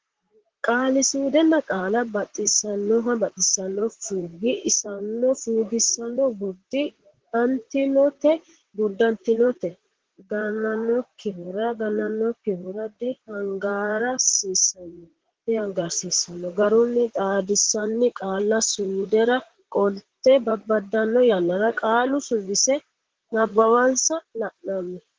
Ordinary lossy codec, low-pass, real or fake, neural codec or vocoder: Opus, 16 kbps; 7.2 kHz; fake; vocoder, 44.1 kHz, 128 mel bands, Pupu-Vocoder